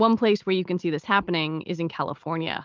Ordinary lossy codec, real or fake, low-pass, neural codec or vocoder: Opus, 32 kbps; real; 7.2 kHz; none